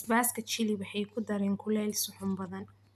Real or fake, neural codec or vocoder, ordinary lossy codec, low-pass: real; none; none; 14.4 kHz